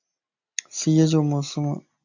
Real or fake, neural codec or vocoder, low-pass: real; none; 7.2 kHz